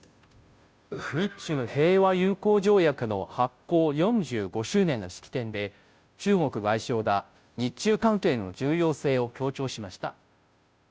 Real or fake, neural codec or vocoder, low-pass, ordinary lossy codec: fake; codec, 16 kHz, 0.5 kbps, FunCodec, trained on Chinese and English, 25 frames a second; none; none